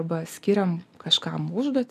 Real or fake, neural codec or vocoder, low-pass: real; none; 14.4 kHz